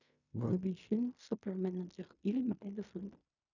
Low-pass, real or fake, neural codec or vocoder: 7.2 kHz; fake; codec, 16 kHz in and 24 kHz out, 0.4 kbps, LongCat-Audio-Codec, fine tuned four codebook decoder